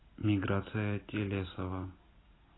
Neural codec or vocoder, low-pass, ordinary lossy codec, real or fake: none; 7.2 kHz; AAC, 16 kbps; real